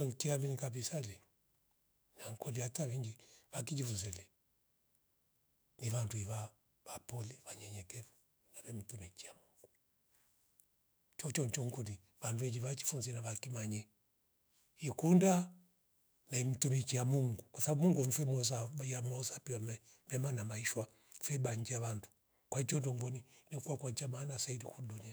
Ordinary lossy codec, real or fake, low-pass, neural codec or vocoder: none; fake; none; autoencoder, 48 kHz, 128 numbers a frame, DAC-VAE, trained on Japanese speech